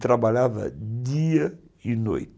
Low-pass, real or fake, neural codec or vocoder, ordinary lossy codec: none; real; none; none